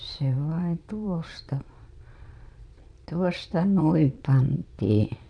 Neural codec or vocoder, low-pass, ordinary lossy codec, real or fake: vocoder, 22.05 kHz, 80 mel bands, Vocos; 9.9 kHz; none; fake